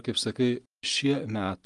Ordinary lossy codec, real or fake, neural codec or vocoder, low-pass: Opus, 32 kbps; real; none; 10.8 kHz